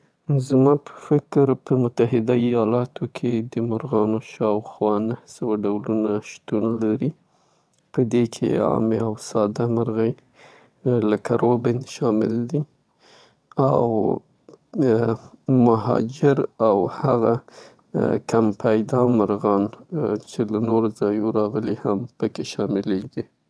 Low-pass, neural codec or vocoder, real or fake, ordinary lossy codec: none; vocoder, 22.05 kHz, 80 mel bands, WaveNeXt; fake; none